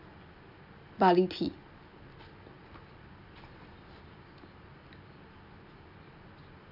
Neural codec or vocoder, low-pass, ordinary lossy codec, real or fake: none; 5.4 kHz; none; real